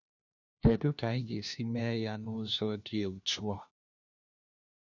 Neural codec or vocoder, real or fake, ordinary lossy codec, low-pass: codec, 16 kHz, 1 kbps, FunCodec, trained on LibriTTS, 50 frames a second; fake; none; 7.2 kHz